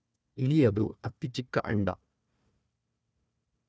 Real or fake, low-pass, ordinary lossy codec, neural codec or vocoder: fake; none; none; codec, 16 kHz, 1 kbps, FunCodec, trained on Chinese and English, 50 frames a second